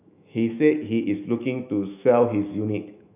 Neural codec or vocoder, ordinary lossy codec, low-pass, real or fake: autoencoder, 48 kHz, 128 numbers a frame, DAC-VAE, trained on Japanese speech; none; 3.6 kHz; fake